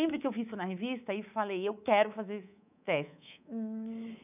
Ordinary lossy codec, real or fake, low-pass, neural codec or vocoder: none; fake; 3.6 kHz; codec, 24 kHz, 3.1 kbps, DualCodec